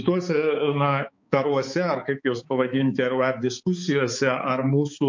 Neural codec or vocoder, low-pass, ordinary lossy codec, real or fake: codec, 16 kHz, 4 kbps, X-Codec, HuBERT features, trained on balanced general audio; 7.2 kHz; MP3, 48 kbps; fake